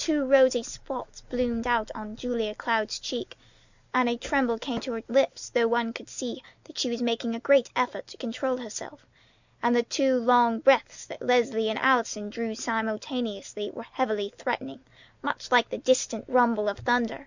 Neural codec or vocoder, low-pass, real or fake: none; 7.2 kHz; real